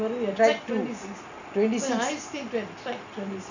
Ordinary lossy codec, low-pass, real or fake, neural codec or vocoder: none; 7.2 kHz; real; none